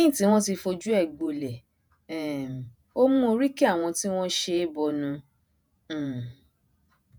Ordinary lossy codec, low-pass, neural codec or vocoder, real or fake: none; none; vocoder, 48 kHz, 128 mel bands, Vocos; fake